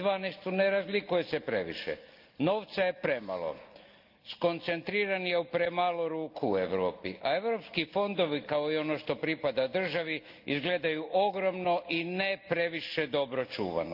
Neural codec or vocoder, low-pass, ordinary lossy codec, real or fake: none; 5.4 kHz; Opus, 24 kbps; real